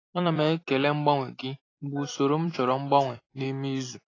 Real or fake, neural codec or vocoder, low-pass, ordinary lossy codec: fake; vocoder, 44.1 kHz, 128 mel bands every 256 samples, BigVGAN v2; 7.2 kHz; AAC, 32 kbps